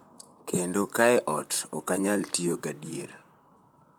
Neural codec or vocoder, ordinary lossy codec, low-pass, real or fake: vocoder, 44.1 kHz, 128 mel bands, Pupu-Vocoder; none; none; fake